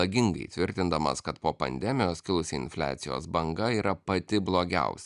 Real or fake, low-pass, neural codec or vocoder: real; 10.8 kHz; none